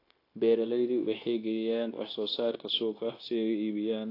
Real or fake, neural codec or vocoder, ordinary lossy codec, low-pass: fake; codec, 16 kHz, 0.9 kbps, LongCat-Audio-Codec; AAC, 32 kbps; 5.4 kHz